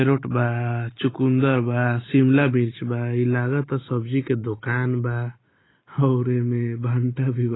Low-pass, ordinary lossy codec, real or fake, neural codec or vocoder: 7.2 kHz; AAC, 16 kbps; real; none